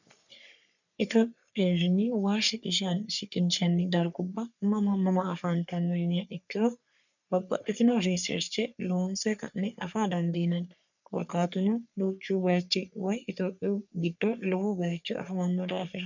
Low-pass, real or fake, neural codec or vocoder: 7.2 kHz; fake; codec, 44.1 kHz, 3.4 kbps, Pupu-Codec